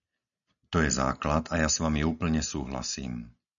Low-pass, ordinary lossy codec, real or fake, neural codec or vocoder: 7.2 kHz; MP3, 96 kbps; real; none